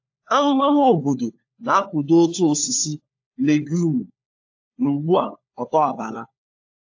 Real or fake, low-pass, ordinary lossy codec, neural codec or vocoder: fake; 7.2 kHz; AAC, 48 kbps; codec, 16 kHz, 4 kbps, FunCodec, trained on LibriTTS, 50 frames a second